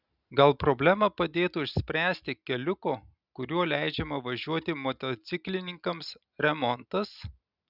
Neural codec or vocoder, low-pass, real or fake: none; 5.4 kHz; real